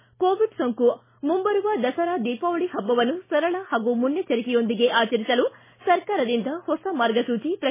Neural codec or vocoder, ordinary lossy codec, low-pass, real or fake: none; MP3, 16 kbps; 3.6 kHz; real